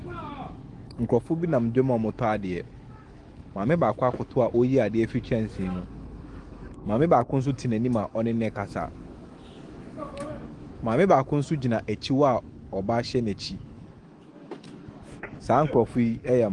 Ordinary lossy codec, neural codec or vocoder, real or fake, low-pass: Opus, 24 kbps; autoencoder, 48 kHz, 128 numbers a frame, DAC-VAE, trained on Japanese speech; fake; 10.8 kHz